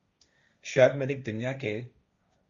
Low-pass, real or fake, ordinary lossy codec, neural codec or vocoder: 7.2 kHz; fake; Opus, 64 kbps; codec, 16 kHz, 1.1 kbps, Voila-Tokenizer